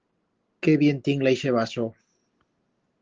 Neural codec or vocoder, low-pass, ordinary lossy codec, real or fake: none; 7.2 kHz; Opus, 16 kbps; real